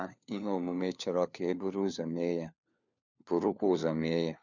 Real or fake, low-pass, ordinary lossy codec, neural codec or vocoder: fake; 7.2 kHz; none; codec, 16 kHz, 4 kbps, FunCodec, trained on LibriTTS, 50 frames a second